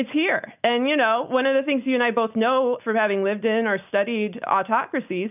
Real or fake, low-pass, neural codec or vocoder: real; 3.6 kHz; none